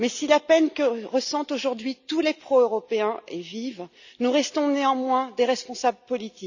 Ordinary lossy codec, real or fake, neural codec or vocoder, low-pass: none; real; none; 7.2 kHz